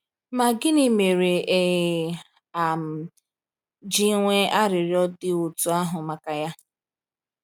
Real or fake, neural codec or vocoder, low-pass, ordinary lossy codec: real; none; 19.8 kHz; none